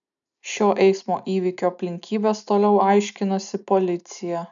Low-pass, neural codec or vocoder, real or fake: 7.2 kHz; none; real